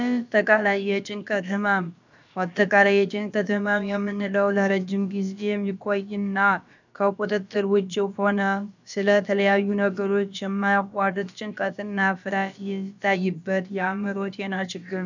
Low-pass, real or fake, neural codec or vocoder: 7.2 kHz; fake; codec, 16 kHz, about 1 kbps, DyCAST, with the encoder's durations